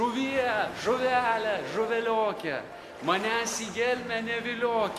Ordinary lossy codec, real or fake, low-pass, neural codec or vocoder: AAC, 64 kbps; real; 14.4 kHz; none